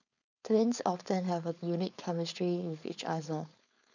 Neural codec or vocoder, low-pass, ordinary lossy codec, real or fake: codec, 16 kHz, 4.8 kbps, FACodec; 7.2 kHz; none; fake